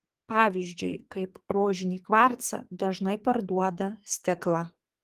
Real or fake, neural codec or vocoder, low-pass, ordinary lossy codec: fake; codec, 44.1 kHz, 2.6 kbps, SNAC; 14.4 kHz; Opus, 24 kbps